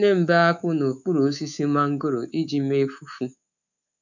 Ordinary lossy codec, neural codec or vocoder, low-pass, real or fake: none; codec, 24 kHz, 3.1 kbps, DualCodec; 7.2 kHz; fake